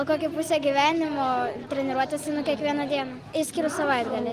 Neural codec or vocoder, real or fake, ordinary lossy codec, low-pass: none; real; Opus, 24 kbps; 14.4 kHz